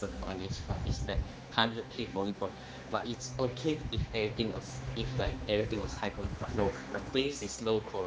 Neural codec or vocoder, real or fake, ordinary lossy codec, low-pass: codec, 16 kHz, 2 kbps, X-Codec, HuBERT features, trained on general audio; fake; none; none